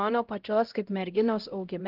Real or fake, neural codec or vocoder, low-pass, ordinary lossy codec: fake; codec, 16 kHz, 0.5 kbps, X-Codec, HuBERT features, trained on LibriSpeech; 5.4 kHz; Opus, 16 kbps